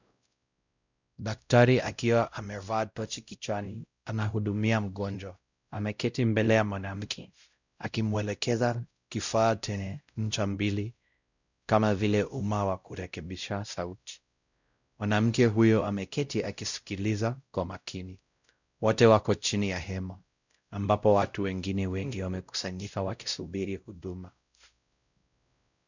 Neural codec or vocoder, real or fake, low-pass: codec, 16 kHz, 0.5 kbps, X-Codec, WavLM features, trained on Multilingual LibriSpeech; fake; 7.2 kHz